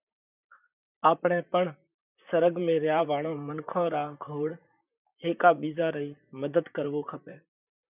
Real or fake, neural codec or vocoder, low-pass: fake; vocoder, 44.1 kHz, 128 mel bands, Pupu-Vocoder; 3.6 kHz